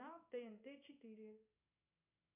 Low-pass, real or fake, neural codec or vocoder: 3.6 kHz; fake; codec, 16 kHz in and 24 kHz out, 1 kbps, XY-Tokenizer